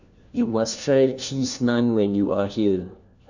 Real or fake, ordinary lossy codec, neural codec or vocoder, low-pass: fake; none; codec, 16 kHz, 1 kbps, FunCodec, trained on LibriTTS, 50 frames a second; 7.2 kHz